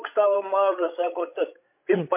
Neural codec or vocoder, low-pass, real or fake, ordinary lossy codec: codec, 16 kHz, 16 kbps, FreqCodec, larger model; 3.6 kHz; fake; MP3, 32 kbps